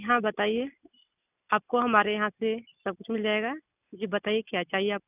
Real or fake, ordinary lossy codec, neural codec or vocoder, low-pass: real; none; none; 3.6 kHz